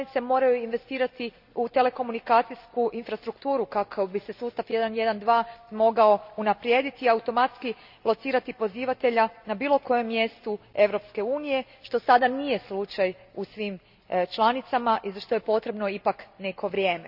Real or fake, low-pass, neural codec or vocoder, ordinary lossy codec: real; 5.4 kHz; none; none